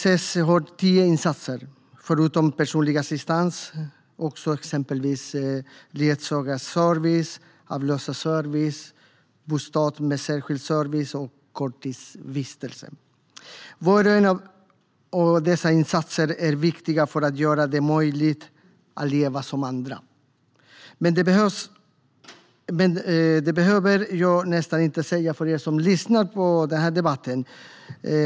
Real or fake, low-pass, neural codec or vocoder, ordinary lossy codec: real; none; none; none